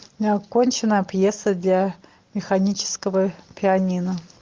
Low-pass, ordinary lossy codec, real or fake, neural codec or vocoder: 7.2 kHz; Opus, 24 kbps; real; none